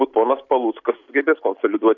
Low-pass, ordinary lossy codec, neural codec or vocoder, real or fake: 7.2 kHz; AAC, 48 kbps; none; real